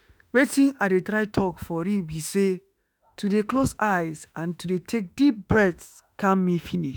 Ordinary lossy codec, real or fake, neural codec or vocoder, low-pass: none; fake; autoencoder, 48 kHz, 32 numbers a frame, DAC-VAE, trained on Japanese speech; none